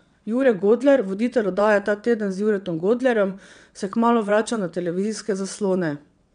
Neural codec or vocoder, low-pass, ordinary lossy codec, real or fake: vocoder, 22.05 kHz, 80 mel bands, WaveNeXt; 9.9 kHz; none; fake